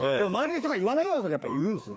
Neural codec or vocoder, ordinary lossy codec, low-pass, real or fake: codec, 16 kHz, 2 kbps, FreqCodec, larger model; none; none; fake